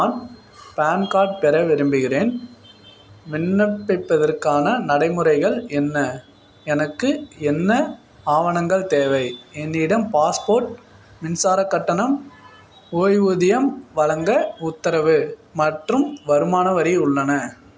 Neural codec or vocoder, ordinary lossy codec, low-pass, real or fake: none; none; none; real